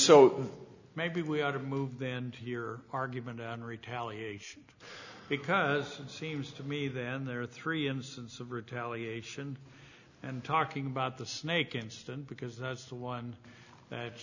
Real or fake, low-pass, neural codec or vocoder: real; 7.2 kHz; none